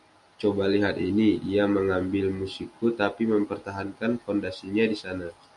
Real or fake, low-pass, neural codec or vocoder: real; 10.8 kHz; none